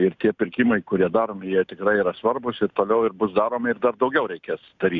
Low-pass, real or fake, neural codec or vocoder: 7.2 kHz; real; none